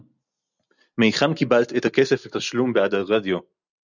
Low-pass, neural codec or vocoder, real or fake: 7.2 kHz; none; real